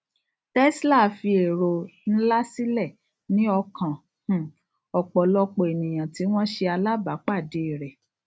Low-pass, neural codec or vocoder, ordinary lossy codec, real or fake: none; none; none; real